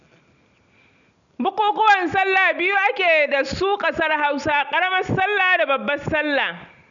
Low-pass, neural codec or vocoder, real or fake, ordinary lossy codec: 7.2 kHz; none; real; none